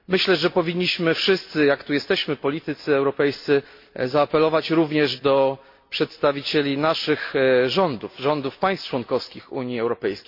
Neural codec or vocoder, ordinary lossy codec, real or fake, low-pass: none; MP3, 32 kbps; real; 5.4 kHz